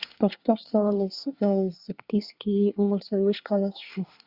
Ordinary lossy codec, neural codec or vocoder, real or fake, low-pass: Opus, 64 kbps; codec, 24 kHz, 1 kbps, SNAC; fake; 5.4 kHz